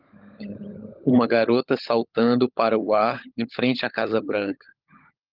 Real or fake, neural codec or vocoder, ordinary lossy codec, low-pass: fake; codec, 16 kHz, 16 kbps, FunCodec, trained on LibriTTS, 50 frames a second; Opus, 24 kbps; 5.4 kHz